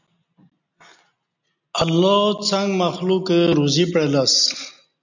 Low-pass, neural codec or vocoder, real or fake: 7.2 kHz; none; real